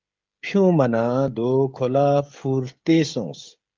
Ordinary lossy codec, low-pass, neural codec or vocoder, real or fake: Opus, 24 kbps; 7.2 kHz; codec, 16 kHz, 16 kbps, FreqCodec, smaller model; fake